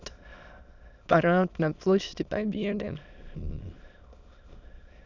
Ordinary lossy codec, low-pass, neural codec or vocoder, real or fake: none; 7.2 kHz; autoencoder, 22.05 kHz, a latent of 192 numbers a frame, VITS, trained on many speakers; fake